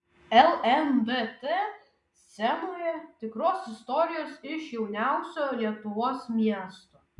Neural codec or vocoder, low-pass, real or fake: none; 10.8 kHz; real